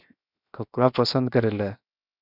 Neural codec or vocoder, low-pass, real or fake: codec, 16 kHz, 0.7 kbps, FocalCodec; 5.4 kHz; fake